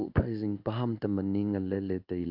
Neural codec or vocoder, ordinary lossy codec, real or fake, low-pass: codec, 16 kHz in and 24 kHz out, 1 kbps, XY-Tokenizer; MP3, 48 kbps; fake; 5.4 kHz